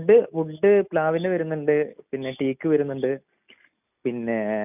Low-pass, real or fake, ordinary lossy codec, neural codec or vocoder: 3.6 kHz; real; none; none